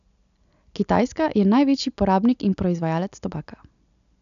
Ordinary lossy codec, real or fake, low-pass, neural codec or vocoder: none; real; 7.2 kHz; none